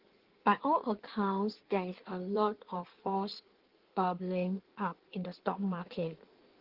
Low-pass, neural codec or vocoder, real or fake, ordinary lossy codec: 5.4 kHz; codec, 16 kHz in and 24 kHz out, 1.1 kbps, FireRedTTS-2 codec; fake; Opus, 16 kbps